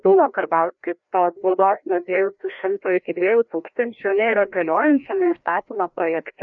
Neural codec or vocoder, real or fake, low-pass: codec, 16 kHz, 1 kbps, FreqCodec, larger model; fake; 7.2 kHz